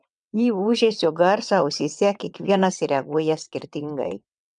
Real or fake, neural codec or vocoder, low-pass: fake; vocoder, 22.05 kHz, 80 mel bands, WaveNeXt; 9.9 kHz